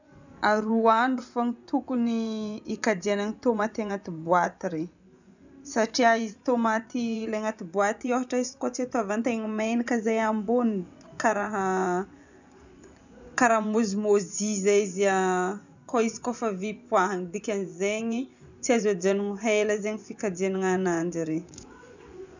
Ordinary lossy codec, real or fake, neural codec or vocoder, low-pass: none; fake; vocoder, 24 kHz, 100 mel bands, Vocos; 7.2 kHz